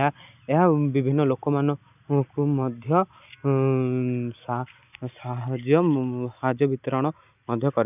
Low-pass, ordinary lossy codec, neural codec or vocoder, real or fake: 3.6 kHz; none; none; real